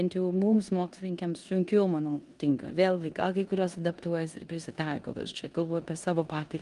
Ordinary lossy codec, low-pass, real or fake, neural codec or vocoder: Opus, 32 kbps; 10.8 kHz; fake; codec, 16 kHz in and 24 kHz out, 0.9 kbps, LongCat-Audio-Codec, four codebook decoder